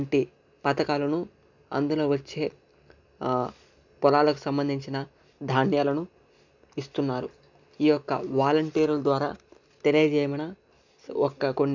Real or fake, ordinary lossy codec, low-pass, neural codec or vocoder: real; none; 7.2 kHz; none